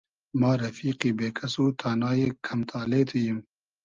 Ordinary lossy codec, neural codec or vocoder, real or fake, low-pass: Opus, 32 kbps; none; real; 7.2 kHz